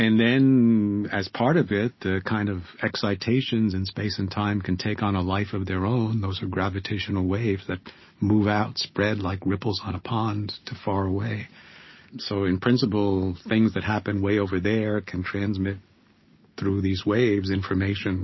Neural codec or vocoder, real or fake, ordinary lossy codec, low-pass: none; real; MP3, 24 kbps; 7.2 kHz